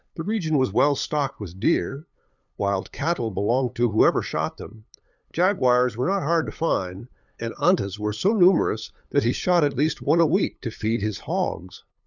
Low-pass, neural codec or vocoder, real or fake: 7.2 kHz; codec, 16 kHz, 8 kbps, FunCodec, trained on LibriTTS, 25 frames a second; fake